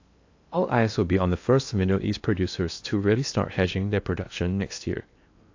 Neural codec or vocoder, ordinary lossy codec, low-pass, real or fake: codec, 16 kHz in and 24 kHz out, 0.8 kbps, FocalCodec, streaming, 65536 codes; AAC, 48 kbps; 7.2 kHz; fake